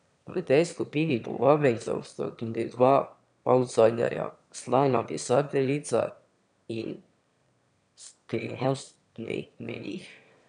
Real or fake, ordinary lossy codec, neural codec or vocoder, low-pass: fake; none; autoencoder, 22.05 kHz, a latent of 192 numbers a frame, VITS, trained on one speaker; 9.9 kHz